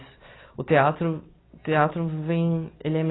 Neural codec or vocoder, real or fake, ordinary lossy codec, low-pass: none; real; AAC, 16 kbps; 7.2 kHz